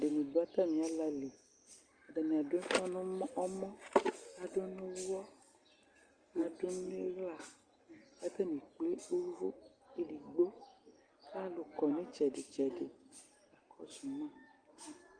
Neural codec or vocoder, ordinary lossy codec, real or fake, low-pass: none; Opus, 32 kbps; real; 9.9 kHz